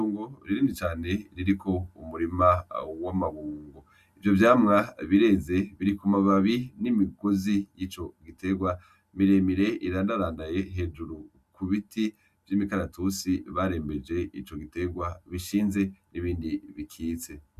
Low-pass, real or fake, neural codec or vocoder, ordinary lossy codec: 14.4 kHz; real; none; Opus, 64 kbps